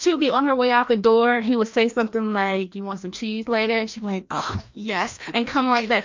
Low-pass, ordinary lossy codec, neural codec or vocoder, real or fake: 7.2 kHz; MP3, 48 kbps; codec, 16 kHz, 1 kbps, FreqCodec, larger model; fake